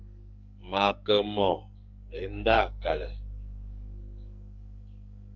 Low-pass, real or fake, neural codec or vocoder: 7.2 kHz; fake; codec, 44.1 kHz, 2.6 kbps, SNAC